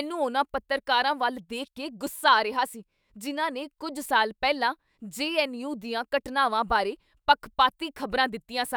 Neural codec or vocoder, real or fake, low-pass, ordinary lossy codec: autoencoder, 48 kHz, 128 numbers a frame, DAC-VAE, trained on Japanese speech; fake; none; none